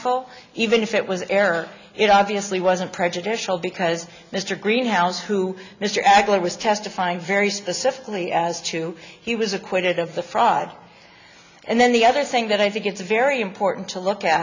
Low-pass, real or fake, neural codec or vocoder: 7.2 kHz; real; none